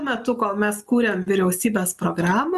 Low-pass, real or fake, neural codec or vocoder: 14.4 kHz; real; none